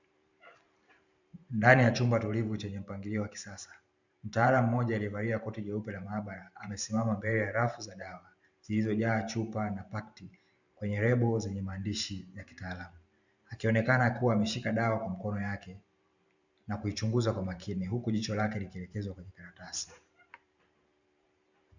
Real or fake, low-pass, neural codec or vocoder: fake; 7.2 kHz; vocoder, 44.1 kHz, 128 mel bands every 512 samples, BigVGAN v2